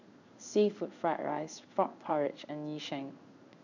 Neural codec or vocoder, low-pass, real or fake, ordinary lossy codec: codec, 16 kHz in and 24 kHz out, 1 kbps, XY-Tokenizer; 7.2 kHz; fake; none